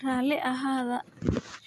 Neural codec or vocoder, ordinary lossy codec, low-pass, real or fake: none; none; 14.4 kHz; real